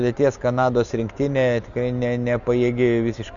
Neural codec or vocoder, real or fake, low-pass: none; real; 7.2 kHz